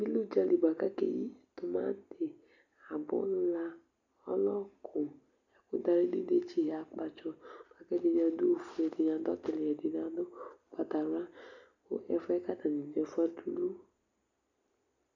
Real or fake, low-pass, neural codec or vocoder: real; 7.2 kHz; none